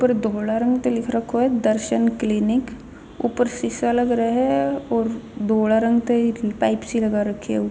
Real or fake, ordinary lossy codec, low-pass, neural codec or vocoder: real; none; none; none